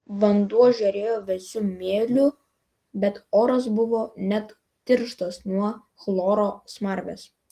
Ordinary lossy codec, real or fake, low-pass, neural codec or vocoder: Opus, 24 kbps; real; 14.4 kHz; none